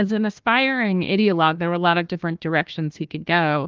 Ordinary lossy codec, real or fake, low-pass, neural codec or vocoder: Opus, 32 kbps; fake; 7.2 kHz; codec, 16 kHz, 1 kbps, FunCodec, trained on Chinese and English, 50 frames a second